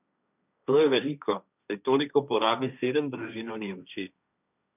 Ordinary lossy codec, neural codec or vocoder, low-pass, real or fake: none; codec, 16 kHz, 1.1 kbps, Voila-Tokenizer; 3.6 kHz; fake